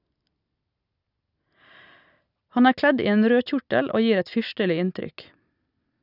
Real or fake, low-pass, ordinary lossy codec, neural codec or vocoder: real; 5.4 kHz; none; none